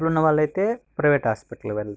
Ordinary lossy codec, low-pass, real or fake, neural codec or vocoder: none; none; real; none